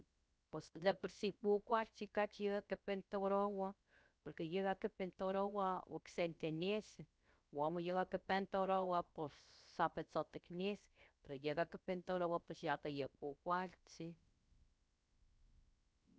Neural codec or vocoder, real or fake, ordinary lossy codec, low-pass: codec, 16 kHz, 0.7 kbps, FocalCodec; fake; none; none